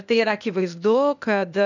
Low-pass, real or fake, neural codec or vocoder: 7.2 kHz; fake; codec, 16 kHz, 0.8 kbps, ZipCodec